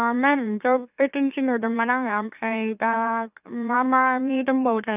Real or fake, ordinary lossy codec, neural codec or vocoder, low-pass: fake; none; autoencoder, 44.1 kHz, a latent of 192 numbers a frame, MeloTTS; 3.6 kHz